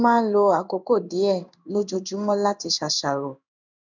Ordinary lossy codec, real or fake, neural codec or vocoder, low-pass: none; fake; codec, 16 kHz in and 24 kHz out, 1 kbps, XY-Tokenizer; 7.2 kHz